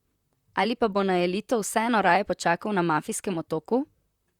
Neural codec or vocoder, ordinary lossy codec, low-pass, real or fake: vocoder, 44.1 kHz, 128 mel bands, Pupu-Vocoder; Opus, 64 kbps; 19.8 kHz; fake